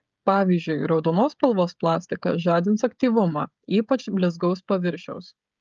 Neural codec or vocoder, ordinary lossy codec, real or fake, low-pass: codec, 16 kHz, 16 kbps, FreqCodec, smaller model; Opus, 24 kbps; fake; 7.2 kHz